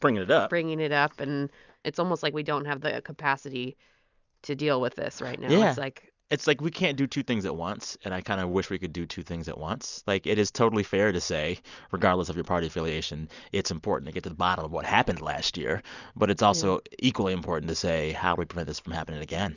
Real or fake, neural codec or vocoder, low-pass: real; none; 7.2 kHz